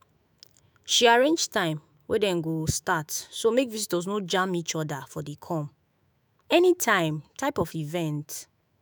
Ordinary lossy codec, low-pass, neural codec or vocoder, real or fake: none; none; autoencoder, 48 kHz, 128 numbers a frame, DAC-VAE, trained on Japanese speech; fake